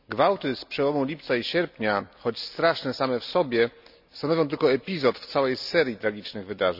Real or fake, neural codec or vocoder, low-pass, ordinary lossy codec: real; none; 5.4 kHz; none